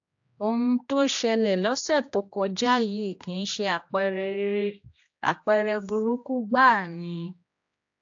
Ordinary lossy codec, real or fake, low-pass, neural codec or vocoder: AAC, 64 kbps; fake; 7.2 kHz; codec, 16 kHz, 1 kbps, X-Codec, HuBERT features, trained on general audio